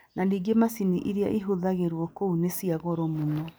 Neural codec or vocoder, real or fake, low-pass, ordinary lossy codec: none; real; none; none